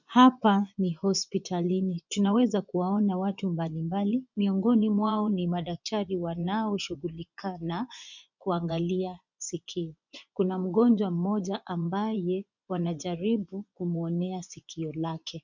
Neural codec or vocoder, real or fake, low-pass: vocoder, 24 kHz, 100 mel bands, Vocos; fake; 7.2 kHz